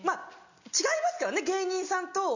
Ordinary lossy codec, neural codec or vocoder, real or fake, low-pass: none; none; real; 7.2 kHz